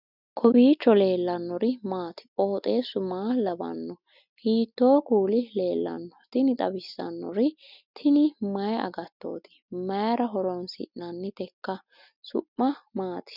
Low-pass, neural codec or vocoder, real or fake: 5.4 kHz; none; real